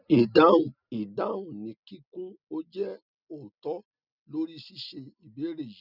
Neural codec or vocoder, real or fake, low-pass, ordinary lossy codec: none; real; 5.4 kHz; none